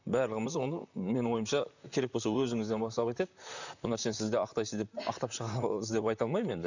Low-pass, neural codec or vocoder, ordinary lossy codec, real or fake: 7.2 kHz; vocoder, 44.1 kHz, 128 mel bands, Pupu-Vocoder; none; fake